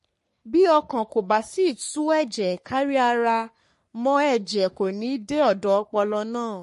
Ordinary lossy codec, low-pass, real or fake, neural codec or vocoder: MP3, 48 kbps; 14.4 kHz; fake; codec, 44.1 kHz, 3.4 kbps, Pupu-Codec